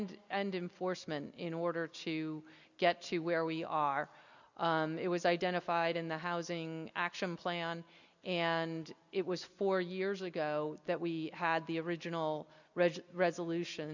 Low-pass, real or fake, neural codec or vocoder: 7.2 kHz; real; none